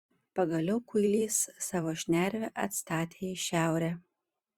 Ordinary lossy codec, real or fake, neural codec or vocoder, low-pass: Opus, 64 kbps; fake; vocoder, 44.1 kHz, 128 mel bands every 512 samples, BigVGAN v2; 19.8 kHz